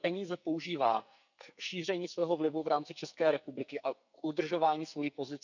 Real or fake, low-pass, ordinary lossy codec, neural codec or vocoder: fake; 7.2 kHz; none; codec, 44.1 kHz, 2.6 kbps, SNAC